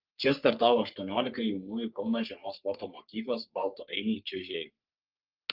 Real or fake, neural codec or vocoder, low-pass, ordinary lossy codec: fake; codec, 44.1 kHz, 3.4 kbps, Pupu-Codec; 5.4 kHz; Opus, 24 kbps